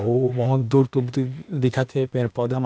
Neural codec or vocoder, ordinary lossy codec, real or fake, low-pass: codec, 16 kHz, 0.8 kbps, ZipCodec; none; fake; none